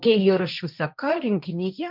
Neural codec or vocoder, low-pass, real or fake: codec, 16 kHz, 1.1 kbps, Voila-Tokenizer; 5.4 kHz; fake